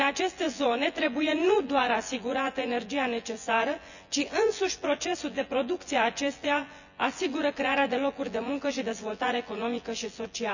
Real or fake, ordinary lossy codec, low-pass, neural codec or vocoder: fake; none; 7.2 kHz; vocoder, 24 kHz, 100 mel bands, Vocos